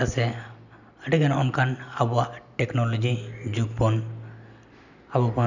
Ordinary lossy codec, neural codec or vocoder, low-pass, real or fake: none; none; 7.2 kHz; real